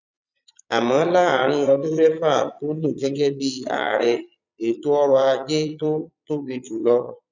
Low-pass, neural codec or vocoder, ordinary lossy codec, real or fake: 7.2 kHz; vocoder, 22.05 kHz, 80 mel bands, Vocos; none; fake